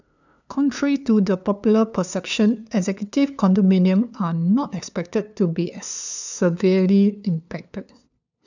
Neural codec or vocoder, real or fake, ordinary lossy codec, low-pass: codec, 16 kHz, 2 kbps, FunCodec, trained on LibriTTS, 25 frames a second; fake; none; 7.2 kHz